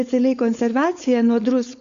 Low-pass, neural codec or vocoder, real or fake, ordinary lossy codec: 7.2 kHz; codec, 16 kHz, 4.8 kbps, FACodec; fake; Opus, 64 kbps